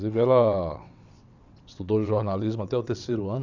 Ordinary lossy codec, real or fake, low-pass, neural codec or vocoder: none; fake; 7.2 kHz; vocoder, 22.05 kHz, 80 mel bands, WaveNeXt